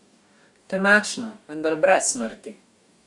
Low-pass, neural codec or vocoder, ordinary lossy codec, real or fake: 10.8 kHz; codec, 44.1 kHz, 2.6 kbps, DAC; none; fake